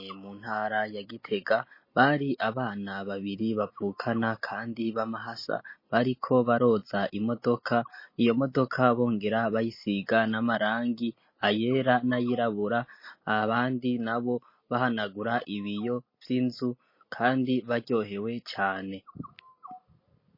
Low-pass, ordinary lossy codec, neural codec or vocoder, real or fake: 5.4 kHz; MP3, 24 kbps; none; real